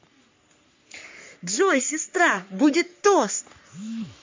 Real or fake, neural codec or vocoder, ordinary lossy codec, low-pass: fake; codec, 44.1 kHz, 3.4 kbps, Pupu-Codec; MP3, 48 kbps; 7.2 kHz